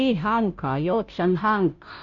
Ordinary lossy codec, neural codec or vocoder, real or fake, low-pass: none; codec, 16 kHz, 0.5 kbps, FunCodec, trained on Chinese and English, 25 frames a second; fake; 7.2 kHz